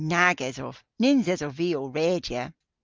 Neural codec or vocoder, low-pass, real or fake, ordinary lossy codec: none; 7.2 kHz; real; Opus, 32 kbps